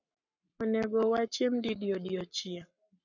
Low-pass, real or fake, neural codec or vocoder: 7.2 kHz; fake; codec, 44.1 kHz, 7.8 kbps, Pupu-Codec